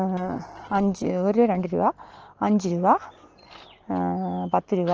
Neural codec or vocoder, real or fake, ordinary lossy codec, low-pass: none; real; Opus, 16 kbps; 7.2 kHz